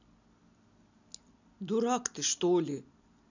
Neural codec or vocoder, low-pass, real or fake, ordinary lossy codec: none; 7.2 kHz; real; none